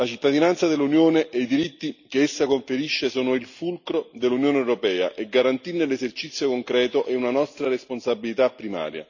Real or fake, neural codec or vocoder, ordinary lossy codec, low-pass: real; none; none; 7.2 kHz